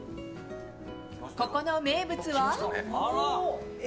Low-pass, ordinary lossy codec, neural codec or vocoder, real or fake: none; none; none; real